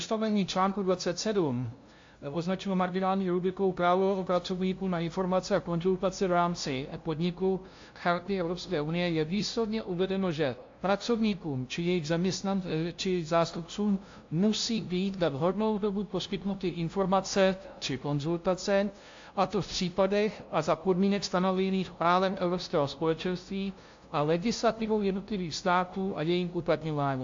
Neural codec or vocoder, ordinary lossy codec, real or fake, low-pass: codec, 16 kHz, 0.5 kbps, FunCodec, trained on LibriTTS, 25 frames a second; AAC, 48 kbps; fake; 7.2 kHz